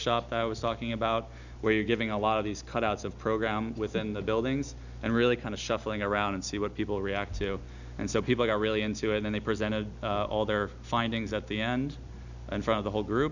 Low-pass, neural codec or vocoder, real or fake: 7.2 kHz; none; real